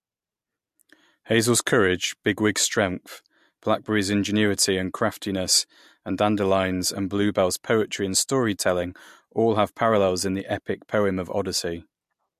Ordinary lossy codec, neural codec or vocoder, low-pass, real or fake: MP3, 64 kbps; none; 14.4 kHz; real